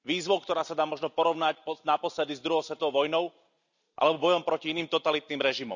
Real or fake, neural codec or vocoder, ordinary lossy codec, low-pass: real; none; none; 7.2 kHz